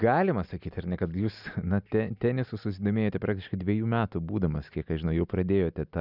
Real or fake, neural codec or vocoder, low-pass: real; none; 5.4 kHz